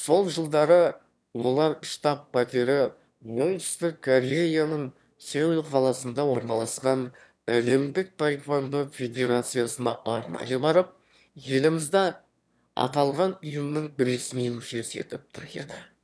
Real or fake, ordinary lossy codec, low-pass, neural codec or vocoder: fake; none; none; autoencoder, 22.05 kHz, a latent of 192 numbers a frame, VITS, trained on one speaker